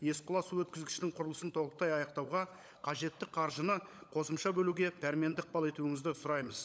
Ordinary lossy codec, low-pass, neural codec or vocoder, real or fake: none; none; codec, 16 kHz, 16 kbps, FunCodec, trained on LibriTTS, 50 frames a second; fake